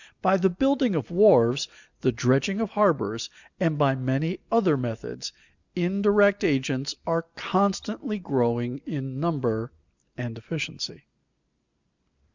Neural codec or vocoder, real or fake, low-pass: none; real; 7.2 kHz